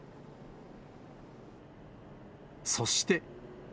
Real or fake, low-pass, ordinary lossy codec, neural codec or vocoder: real; none; none; none